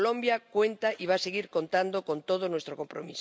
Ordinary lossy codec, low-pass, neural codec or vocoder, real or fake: none; none; none; real